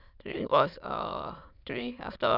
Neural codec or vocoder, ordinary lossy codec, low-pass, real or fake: autoencoder, 22.05 kHz, a latent of 192 numbers a frame, VITS, trained on many speakers; none; 5.4 kHz; fake